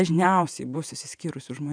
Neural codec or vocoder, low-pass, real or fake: vocoder, 48 kHz, 128 mel bands, Vocos; 9.9 kHz; fake